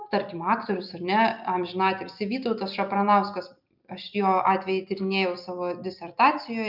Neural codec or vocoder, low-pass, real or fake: none; 5.4 kHz; real